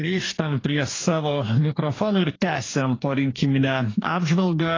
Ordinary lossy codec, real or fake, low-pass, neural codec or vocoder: AAC, 32 kbps; fake; 7.2 kHz; codec, 44.1 kHz, 2.6 kbps, DAC